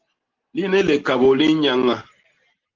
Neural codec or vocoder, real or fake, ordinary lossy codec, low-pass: none; real; Opus, 16 kbps; 7.2 kHz